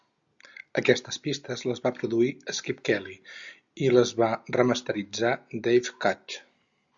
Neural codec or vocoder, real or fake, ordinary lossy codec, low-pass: none; real; Opus, 64 kbps; 7.2 kHz